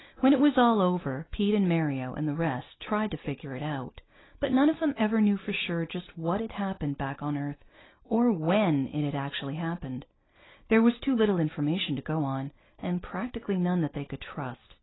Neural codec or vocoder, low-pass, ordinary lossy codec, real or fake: none; 7.2 kHz; AAC, 16 kbps; real